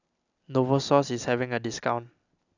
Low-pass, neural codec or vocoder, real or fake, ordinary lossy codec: 7.2 kHz; none; real; none